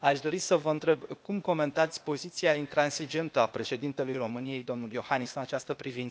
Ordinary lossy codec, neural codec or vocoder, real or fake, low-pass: none; codec, 16 kHz, 0.8 kbps, ZipCodec; fake; none